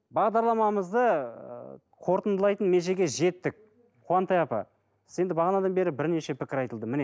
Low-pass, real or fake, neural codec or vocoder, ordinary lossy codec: none; real; none; none